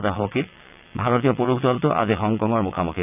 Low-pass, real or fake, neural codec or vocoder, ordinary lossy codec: 3.6 kHz; fake; vocoder, 22.05 kHz, 80 mel bands, WaveNeXt; none